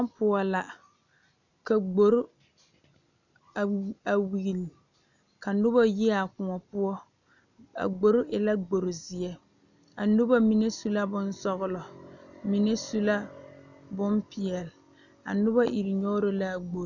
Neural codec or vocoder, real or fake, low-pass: none; real; 7.2 kHz